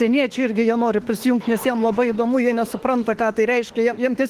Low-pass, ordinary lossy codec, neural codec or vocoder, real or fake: 14.4 kHz; Opus, 16 kbps; autoencoder, 48 kHz, 32 numbers a frame, DAC-VAE, trained on Japanese speech; fake